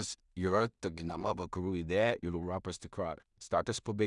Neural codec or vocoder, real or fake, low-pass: codec, 16 kHz in and 24 kHz out, 0.4 kbps, LongCat-Audio-Codec, two codebook decoder; fake; 10.8 kHz